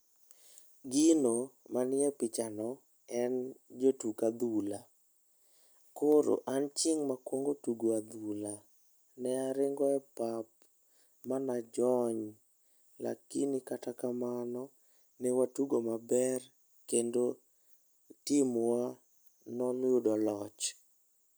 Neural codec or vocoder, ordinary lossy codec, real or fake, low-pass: none; none; real; none